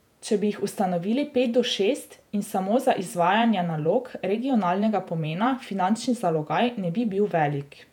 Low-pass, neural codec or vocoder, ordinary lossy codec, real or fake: 19.8 kHz; vocoder, 48 kHz, 128 mel bands, Vocos; none; fake